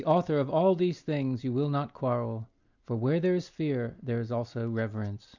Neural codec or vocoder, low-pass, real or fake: none; 7.2 kHz; real